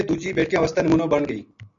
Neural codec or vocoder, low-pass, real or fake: none; 7.2 kHz; real